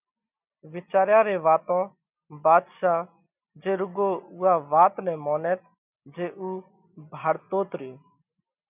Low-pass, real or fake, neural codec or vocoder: 3.6 kHz; real; none